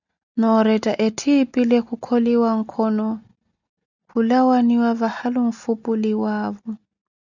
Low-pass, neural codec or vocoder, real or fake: 7.2 kHz; none; real